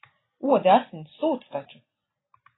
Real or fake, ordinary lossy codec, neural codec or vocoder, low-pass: real; AAC, 16 kbps; none; 7.2 kHz